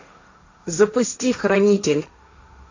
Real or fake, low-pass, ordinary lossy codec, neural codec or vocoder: fake; 7.2 kHz; AAC, 48 kbps; codec, 16 kHz, 1.1 kbps, Voila-Tokenizer